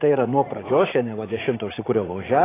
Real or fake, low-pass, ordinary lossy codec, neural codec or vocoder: fake; 3.6 kHz; AAC, 16 kbps; vocoder, 44.1 kHz, 80 mel bands, Vocos